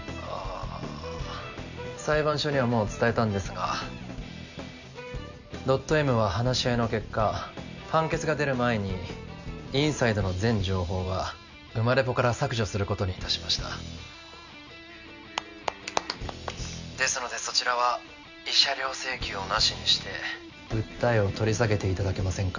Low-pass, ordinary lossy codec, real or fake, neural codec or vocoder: 7.2 kHz; none; real; none